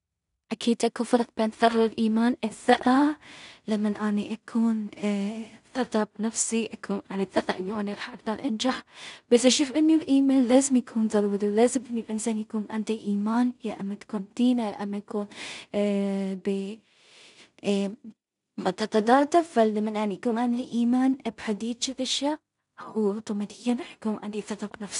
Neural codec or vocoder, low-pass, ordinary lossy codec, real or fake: codec, 16 kHz in and 24 kHz out, 0.4 kbps, LongCat-Audio-Codec, two codebook decoder; 10.8 kHz; none; fake